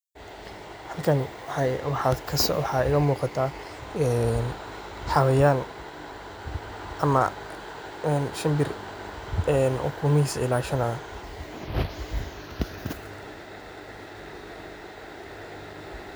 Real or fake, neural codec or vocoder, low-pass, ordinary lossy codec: real; none; none; none